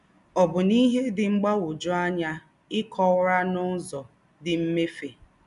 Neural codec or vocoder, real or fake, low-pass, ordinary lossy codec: none; real; 10.8 kHz; none